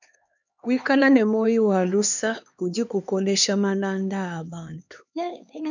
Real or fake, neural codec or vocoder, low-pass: fake; codec, 16 kHz, 2 kbps, X-Codec, HuBERT features, trained on LibriSpeech; 7.2 kHz